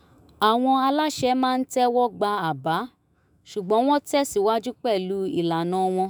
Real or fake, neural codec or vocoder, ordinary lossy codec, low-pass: fake; autoencoder, 48 kHz, 128 numbers a frame, DAC-VAE, trained on Japanese speech; none; none